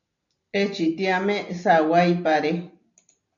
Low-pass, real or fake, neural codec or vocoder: 7.2 kHz; real; none